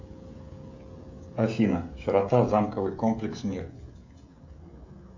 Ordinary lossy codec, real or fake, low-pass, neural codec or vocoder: AAC, 48 kbps; fake; 7.2 kHz; codec, 16 kHz, 16 kbps, FreqCodec, smaller model